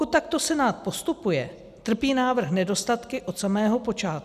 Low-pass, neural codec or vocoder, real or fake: 14.4 kHz; none; real